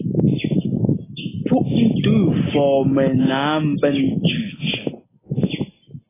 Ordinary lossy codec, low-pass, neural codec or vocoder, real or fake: AAC, 16 kbps; 3.6 kHz; none; real